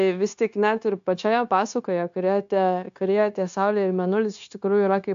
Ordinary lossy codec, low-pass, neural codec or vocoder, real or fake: AAC, 64 kbps; 7.2 kHz; codec, 16 kHz, 0.9 kbps, LongCat-Audio-Codec; fake